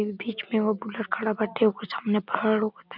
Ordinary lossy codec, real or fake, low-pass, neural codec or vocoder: none; real; 5.4 kHz; none